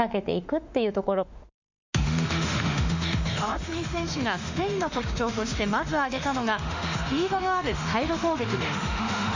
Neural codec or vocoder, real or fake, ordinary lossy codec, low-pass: autoencoder, 48 kHz, 32 numbers a frame, DAC-VAE, trained on Japanese speech; fake; none; 7.2 kHz